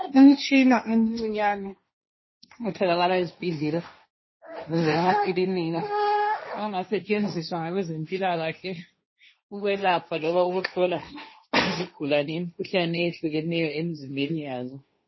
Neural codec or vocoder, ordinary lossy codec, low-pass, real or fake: codec, 16 kHz, 1.1 kbps, Voila-Tokenizer; MP3, 24 kbps; 7.2 kHz; fake